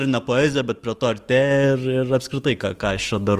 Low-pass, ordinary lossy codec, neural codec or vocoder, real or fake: 14.4 kHz; Opus, 24 kbps; autoencoder, 48 kHz, 128 numbers a frame, DAC-VAE, trained on Japanese speech; fake